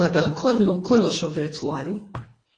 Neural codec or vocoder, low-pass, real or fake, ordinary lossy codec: codec, 24 kHz, 1.5 kbps, HILCodec; 9.9 kHz; fake; AAC, 32 kbps